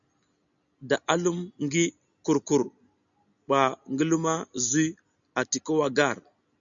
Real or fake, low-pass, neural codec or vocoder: real; 7.2 kHz; none